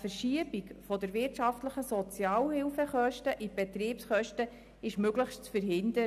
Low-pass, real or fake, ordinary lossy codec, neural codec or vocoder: 14.4 kHz; real; none; none